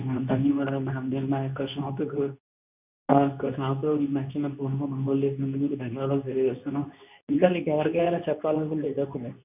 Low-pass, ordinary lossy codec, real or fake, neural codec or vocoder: 3.6 kHz; none; fake; codec, 24 kHz, 0.9 kbps, WavTokenizer, medium speech release version 2